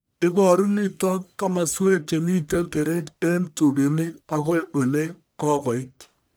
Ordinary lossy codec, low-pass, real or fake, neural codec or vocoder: none; none; fake; codec, 44.1 kHz, 1.7 kbps, Pupu-Codec